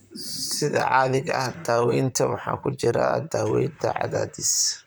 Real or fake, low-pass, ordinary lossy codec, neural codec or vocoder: fake; none; none; vocoder, 44.1 kHz, 128 mel bands, Pupu-Vocoder